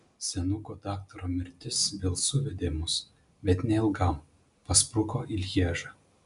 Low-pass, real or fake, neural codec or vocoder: 10.8 kHz; real; none